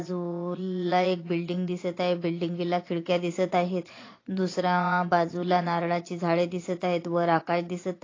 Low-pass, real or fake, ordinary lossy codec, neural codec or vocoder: 7.2 kHz; fake; AAC, 32 kbps; vocoder, 22.05 kHz, 80 mel bands, Vocos